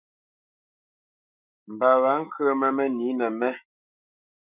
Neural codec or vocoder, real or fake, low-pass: autoencoder, 48 kHz, 128 numbers a frame, DAC-VAE, trained on Japanese speech; fake; 3.6 kHz